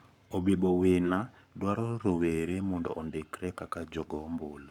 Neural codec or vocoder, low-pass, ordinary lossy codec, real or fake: codec, 44.1 kHz, 7.8 kbps, Pupu-Codec; 19.8 kHz; none; fake